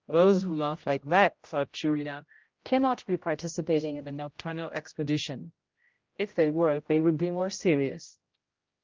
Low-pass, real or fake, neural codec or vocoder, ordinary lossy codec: 7.2 kHz; fake; codec, 16 kHz, 0.5 kbps, X-Codec, HuBERT features, trained on general audio; Opus, 32 kbps